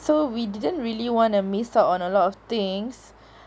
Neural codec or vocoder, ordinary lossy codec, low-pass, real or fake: none; none; none; real